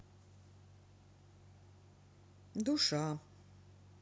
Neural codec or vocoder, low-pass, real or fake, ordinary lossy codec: none; none; real; none